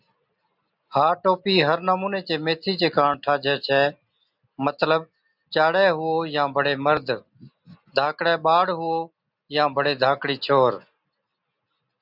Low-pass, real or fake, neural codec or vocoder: 5.4 kHz; real; none